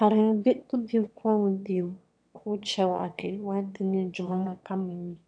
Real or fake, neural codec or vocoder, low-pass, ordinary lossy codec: fake; autoencoder, 22.05 kHz, a latent of 192 numbers a frame, VITS, trained on one speaker; 9.9 kHz; AAC, 64 kbps